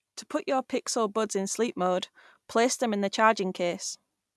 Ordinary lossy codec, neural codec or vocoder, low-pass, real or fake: none; none; none; real